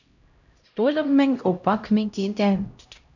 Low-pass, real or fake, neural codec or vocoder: 7.2 kHz; fake; codec, 16 kHz, 0.5 kbps, X-Codec, HuBERT features, trained on LibriSpeech